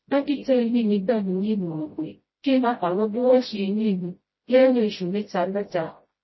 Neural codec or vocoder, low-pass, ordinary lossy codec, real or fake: codec, 16 kHz, 0.5 kbps, FreqCodec, smaller model; 7.2 kHz; MP3, 24 kbps; fake